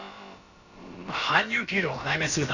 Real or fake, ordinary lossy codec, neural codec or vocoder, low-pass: fake; none; codec, 16 kHz, about 1 kbps, DyCAST, with the encoder's durations; 7.2 kHz